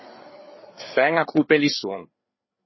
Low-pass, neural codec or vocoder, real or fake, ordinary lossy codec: 7.2 kHz; codec, 16 kHz, 4 kbps, FreqCodec, larger model; fake; MP3, 24 kbps